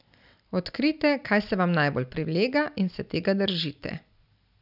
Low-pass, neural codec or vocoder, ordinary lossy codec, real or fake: 5.4 kHz; none; none; real